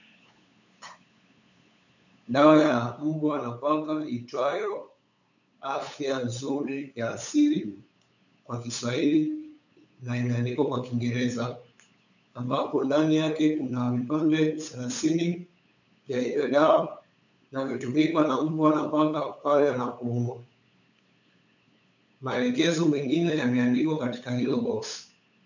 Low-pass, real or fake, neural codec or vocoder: 7.2 kHz; fake; codec, 16 kHz, 8 kbps, FunCodec, trained on LibriTTS, 25 frames a second